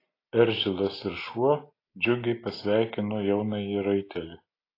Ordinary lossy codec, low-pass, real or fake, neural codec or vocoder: AAC, 24 kbps; 5.4 kHz; real; none